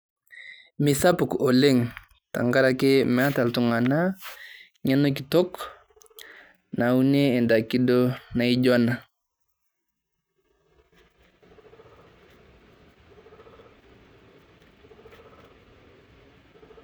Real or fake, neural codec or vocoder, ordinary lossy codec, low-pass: real; none; none; none